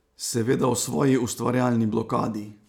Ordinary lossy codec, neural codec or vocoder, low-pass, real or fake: none; none; 19.8 kHz; real